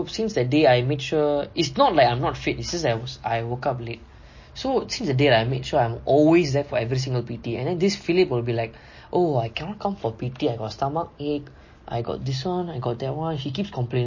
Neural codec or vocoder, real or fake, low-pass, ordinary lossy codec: none; real; 7.2 kHz; MP3, 32 kbps